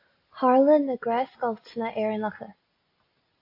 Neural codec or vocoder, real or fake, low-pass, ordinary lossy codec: none; real; 5.4 kHz; AAC, 32 kbps